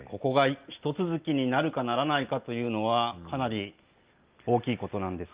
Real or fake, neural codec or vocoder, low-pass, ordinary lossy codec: real; none; 3.6 kHz; Opus, 32 kbps